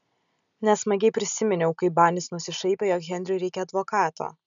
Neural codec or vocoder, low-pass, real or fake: none; 7.2 kHz; real